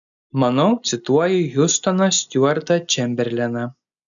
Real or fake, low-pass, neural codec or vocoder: real; 7.2 kHz; none